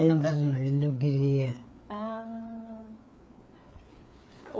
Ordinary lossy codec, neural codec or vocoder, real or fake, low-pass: none; codec, 16 kHz, 4 kbps, FreqCodec, larger model; fake; none